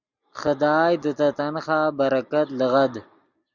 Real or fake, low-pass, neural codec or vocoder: real; 7.2 kHz; none